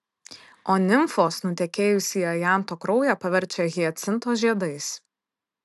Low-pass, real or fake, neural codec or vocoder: 14.4 kHz; real; none